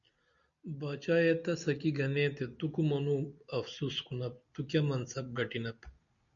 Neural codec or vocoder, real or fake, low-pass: none; real; 7.2 kHz